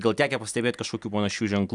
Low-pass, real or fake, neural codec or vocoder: 10.8 kHz; real; none